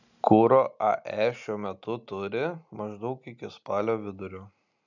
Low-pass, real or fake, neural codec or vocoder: 7.2 kHz; real; none